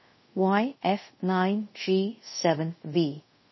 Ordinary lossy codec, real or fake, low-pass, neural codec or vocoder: MP3, 24 kbps; fake; 7.2 kHz; codec, 24 kHz, 0.5 kbps, DualCodec